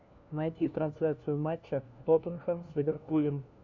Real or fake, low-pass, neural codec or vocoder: fake; 7.2 kHz; codec, 16 kHz, 1 kbps, FunCodec, trained on LibriTTS, 50 frames a second